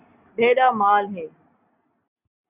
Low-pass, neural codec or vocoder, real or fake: 3.6 kHz; none; real